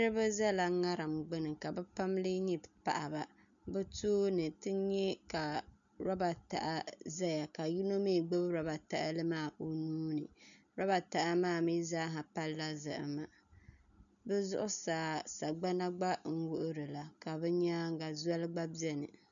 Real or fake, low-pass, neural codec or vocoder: real; 7.2 kHz; none